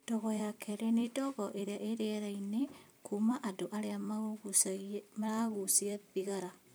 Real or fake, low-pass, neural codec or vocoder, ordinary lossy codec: fake; none; vocoder, 44.1 kHz, 128 mel bands every 256 samples, BigVGAN v2; none